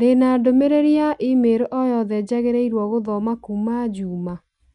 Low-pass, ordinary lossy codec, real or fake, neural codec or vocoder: 10.8 kHz; none; real; none